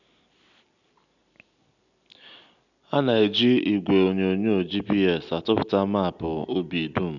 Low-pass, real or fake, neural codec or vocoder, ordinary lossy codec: 7.2 kHz; real; none; none